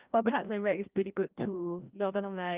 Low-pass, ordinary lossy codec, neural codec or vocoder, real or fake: 3.6 kHz; Opus, 32 kbps; codec, 16 kHz, 1 kbps, FreqCodec, larger model; fake